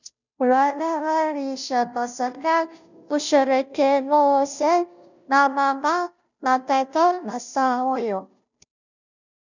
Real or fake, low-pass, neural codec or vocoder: fake; 7.2 kHz; codec, 16 kHz, 0.5 kbps, FunCodec, trained on Chinese and English, 25 frames a second